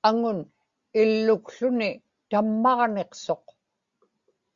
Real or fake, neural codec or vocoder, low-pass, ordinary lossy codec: real; none; 7.2 kHz; Opus, 64 kbps